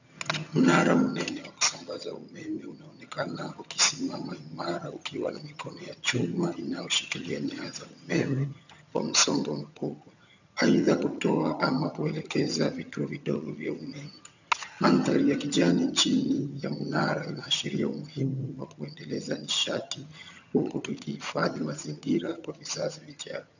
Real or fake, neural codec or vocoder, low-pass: fake; vocoder, 22.05 kHz, 80 mel bands, HiFi-GAN; 7.2 kHz